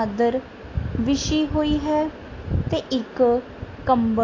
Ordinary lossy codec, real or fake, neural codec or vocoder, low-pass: AAC, 32 kbps; real; none; 7.2 kHz